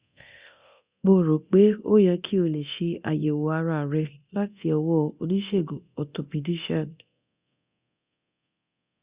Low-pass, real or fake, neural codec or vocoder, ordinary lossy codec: 3.6 kHz; fake; codec, 24 kHz, 0.9 kbps, DualCodec; Opus, 64 kbps